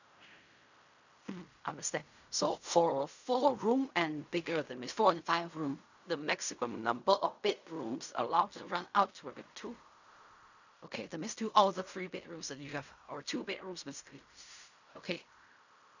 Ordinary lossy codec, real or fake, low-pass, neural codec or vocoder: none; fake; 7.2 kHz; codec, 16 kHz in and 24 kHz out, 0.4 kbps, LongCat-Audio-Codec, fine tuned four codebook decoder